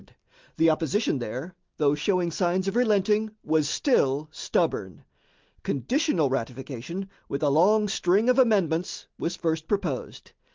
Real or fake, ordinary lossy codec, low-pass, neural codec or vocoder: real; Opus, 32 kbps; 7.2 kHz; none